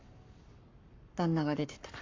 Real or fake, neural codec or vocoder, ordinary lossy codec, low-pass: fake; codec, 44.1 kHz, 7.8 kbps, Pupu-Codec; MP3, 48 kbps; 7.2 kHz